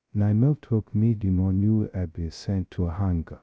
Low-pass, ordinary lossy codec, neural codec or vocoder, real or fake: none; none; codec, 16 kHz, 0.2 kbps, FocalCodec; fake